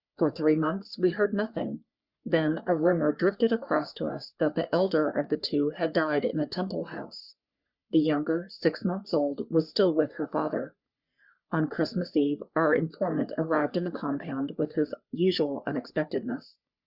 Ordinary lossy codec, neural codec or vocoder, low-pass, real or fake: Opus, 64 kbps; codec, 44.1 kHz, 3.4 kbps, Pupu-Codec; 5.4 kHz; fake